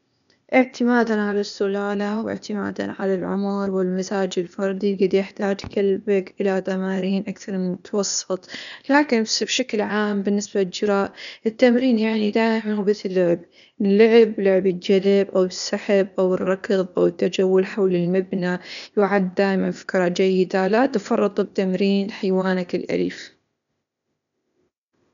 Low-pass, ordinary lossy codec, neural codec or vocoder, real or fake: 7.2 kHz; none; codec, 16 kHz, 0.8 kbps, ZipCodec; fake